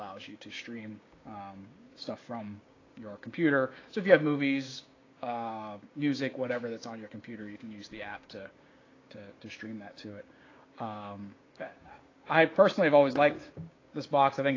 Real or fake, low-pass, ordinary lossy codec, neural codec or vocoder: fake; 7.2 kHz; AAC, 32 kbps; codec, 16 kHz, 6 kbps, DAC